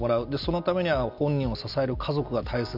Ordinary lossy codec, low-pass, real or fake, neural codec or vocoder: none; 5.4 kHz; real; none